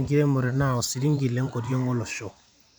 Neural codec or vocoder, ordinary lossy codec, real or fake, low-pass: vocoder, 44.1 kHz, 128 mel bands every 512 samples, BigVGAN v2; none; fake; none